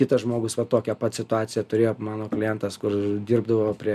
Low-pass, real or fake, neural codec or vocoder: 14.4 kHz; real; none